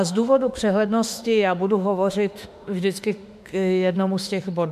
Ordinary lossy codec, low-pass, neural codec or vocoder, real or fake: MP3, 96 kbps; 14.4 kHz; autoencoder, 48 kHz, 32 numbers a frame, DAC-VAE, trained on Japanese speech; fake